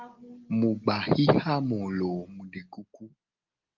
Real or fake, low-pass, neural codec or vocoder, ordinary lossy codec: real; 7.2 kHz; none; Opus, 24 kbps